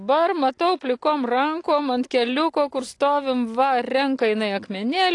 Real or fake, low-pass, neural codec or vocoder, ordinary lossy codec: real; 10.8 kHz; none; AAC, 48 kbps